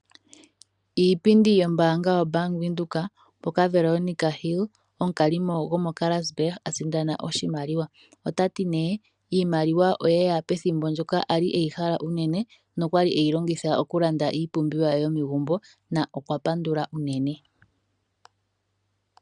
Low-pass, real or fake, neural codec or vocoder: 10.8 kHz; real; none